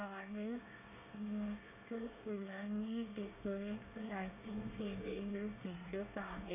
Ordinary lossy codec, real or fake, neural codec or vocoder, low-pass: none; fake; codec, 24 kHz, 1 kbps, SNAC; 3.6 kHz